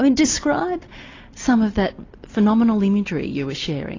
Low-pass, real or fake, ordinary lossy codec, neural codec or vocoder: 7.2 kHz; real; AAC, 32 kbps; none